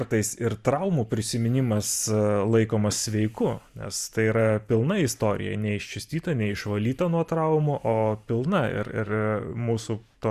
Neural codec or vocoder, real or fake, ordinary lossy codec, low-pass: vocoder, 44.1 kHz, 128 mel bands every 512 samples, BigVGAN v2; fake; Opus, 64 kbps; 14.4 kHz